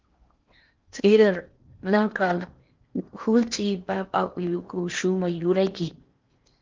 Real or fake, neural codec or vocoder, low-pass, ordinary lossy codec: fake; codec, 16 kHz in and 24 kHz out, 0.8 kbps, FocalCodec, streaming, 65536 codes; 7.2 kHz; Opus, 24 kbps